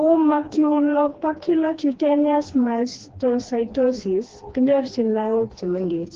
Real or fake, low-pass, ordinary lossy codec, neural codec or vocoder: fake; 7.2 kHz; Opus, 16 kbps; codec, 16 kHz, 2 kbps, FreqCodec, smaller model